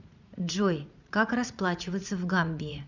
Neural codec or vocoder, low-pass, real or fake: vocoder, 44.1 kHz, 80 mel bands, Vocos; 7.2 kHz; fake